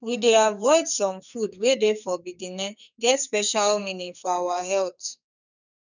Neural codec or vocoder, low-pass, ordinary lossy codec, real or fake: codec, 44.1 kHz, 2.6 kbps, SNAC; 7.2 kHz; none; fake